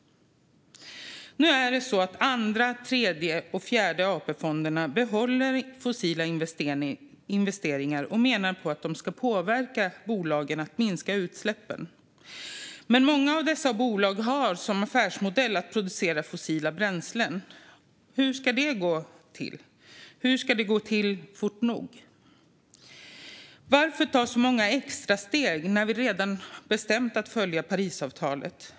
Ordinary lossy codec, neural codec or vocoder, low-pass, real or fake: none; none; none; real